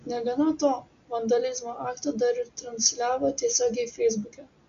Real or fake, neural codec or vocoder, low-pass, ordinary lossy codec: real; none; 7.2 kHz; Opus, 64 kbps